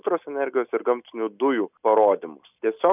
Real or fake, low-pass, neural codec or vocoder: real; 3.6 kHz; none